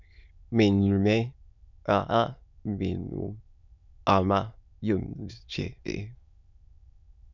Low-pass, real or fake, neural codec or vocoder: 7.2 kHz; fake; autoencoder, 22.05 kHz, a latent of 192 numbers a frame, VITS, trained on many speakers